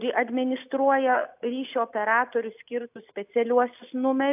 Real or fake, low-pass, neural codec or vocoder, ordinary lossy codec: real; 3.6 kHz; none; AAC, 32 kbps